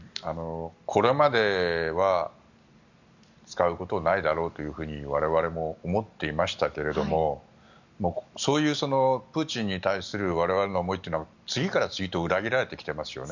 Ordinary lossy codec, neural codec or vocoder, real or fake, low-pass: none; none; real; 7.2 kHz